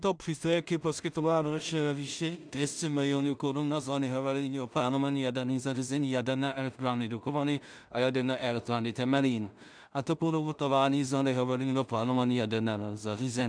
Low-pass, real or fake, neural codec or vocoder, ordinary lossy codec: 9.9 kHz; fake; codec, 16 kHz in and 24 kHz out, 0.4 kbps, LongCat-Audio-Codec, two codebook decoder; MP3, 96 kbps